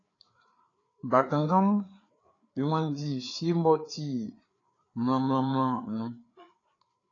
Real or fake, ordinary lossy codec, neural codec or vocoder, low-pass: fake; AAC, 32 kbps; codec, 16 kHz, 4 kbps, FreqCodec, larger model; 7.2 kHz